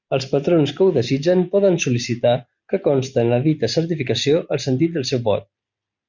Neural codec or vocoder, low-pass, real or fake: none; 7.2 kHz; real